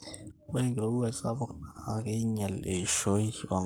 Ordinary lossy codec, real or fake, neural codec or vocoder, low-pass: none; fake; codec, 44.1 kHz, 7.8 kbps, Pupu-Codec; none